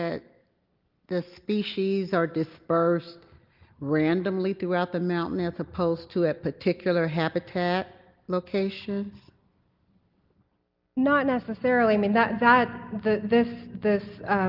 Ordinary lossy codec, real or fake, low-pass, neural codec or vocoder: Opus, 32 kbps; real; 5.4 kHz; none